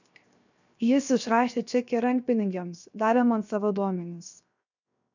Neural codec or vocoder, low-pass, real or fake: codec, 16 kHz, 0.7 kbps, FocalCodec; 7.2 kHz; fake